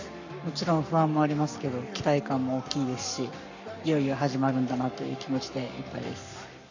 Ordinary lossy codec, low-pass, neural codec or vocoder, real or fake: none; 7.2 kHz; codec, 44.1 kHz, 7.8 kbps, Pupu-Codec; fake